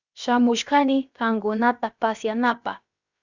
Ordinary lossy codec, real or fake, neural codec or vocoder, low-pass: Opus, 64 kbps; fake; codec, 16 kHz, about 1 kbps, DyCAST, with the encoder's durations; 7.2 kHz